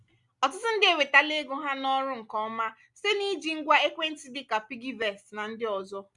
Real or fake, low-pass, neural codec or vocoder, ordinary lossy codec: real; 10.8 kHz; none; none